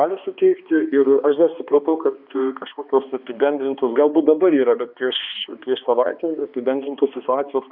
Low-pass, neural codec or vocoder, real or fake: 5.4 kHz; codec, 16 kHz, 2 kbps, X-Codec, HuBERT features, trained on balanced general audio; fake